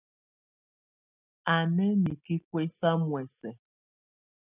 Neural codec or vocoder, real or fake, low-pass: none; real; 3.6 kHz